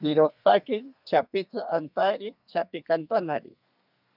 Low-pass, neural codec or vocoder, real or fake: 5.4 kHz; codec, 32 kHz, 1.9 kbps, SNAC; fake